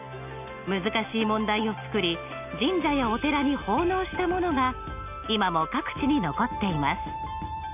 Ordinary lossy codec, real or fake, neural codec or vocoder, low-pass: none; real; none; 3.6 kHz